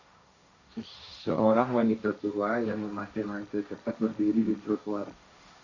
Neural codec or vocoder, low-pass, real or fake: codec, 16 kHz, 1.1 kbps, Voila-Tokenizer; 7.2 kHz; fake